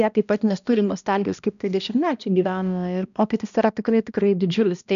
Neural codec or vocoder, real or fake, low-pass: codec, 16 kHz, 1 kbps, X-Codec, HuBERT features, trained on balanced general audio; fake; 7.2 kHz